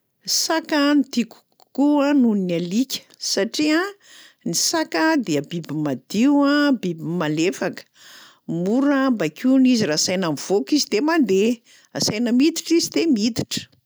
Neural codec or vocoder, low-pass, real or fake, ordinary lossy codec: none; none; real; none